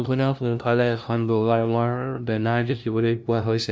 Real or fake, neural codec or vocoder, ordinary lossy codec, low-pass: fake; codec, 16 kHz, 0.5 kbps, FunCodec, trained on LibriTTS, 25 frames a second; none; none